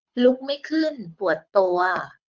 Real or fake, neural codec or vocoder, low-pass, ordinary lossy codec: fake; codec, 24 kHz, 6 kbps, HILCodec; 7.2 kHz; Opus, 64 kbps